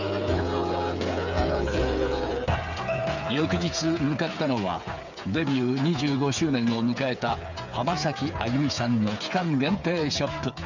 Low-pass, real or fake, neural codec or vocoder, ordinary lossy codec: 7.2 kHz; fake; codec, 16 kHz, 8 kbps, FreqCodec, smaller model; none